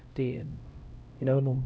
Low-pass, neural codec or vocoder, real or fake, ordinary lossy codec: none; codec, 16 kHz, 0.5 kbps, X-Codec, HuBERT features, trained on LibriSpeech; fake; none